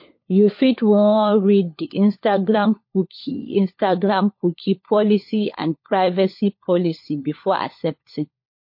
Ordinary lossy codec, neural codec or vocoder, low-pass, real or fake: MP3, 32 kbps; codec, 16 kHz, 4 kbps, FunCodec, trained on LibriTTS, 50 frames a second; 5.4 kHz; fake